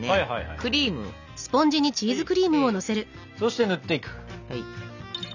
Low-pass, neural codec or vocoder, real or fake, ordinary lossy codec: 7.2 kHz; none; real; none